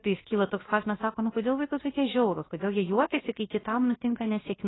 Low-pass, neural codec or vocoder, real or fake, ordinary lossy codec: 7.2 kHz; codec, 16 kHz, about 1 kbps, DyCAST, with the encoder's durations; fake; AAC, 16 kbps